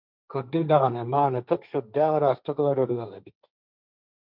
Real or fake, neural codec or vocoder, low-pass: fake; codec, 16 kHz, 1.1 kbps, Voila-Tokenizer; 5.4 kHz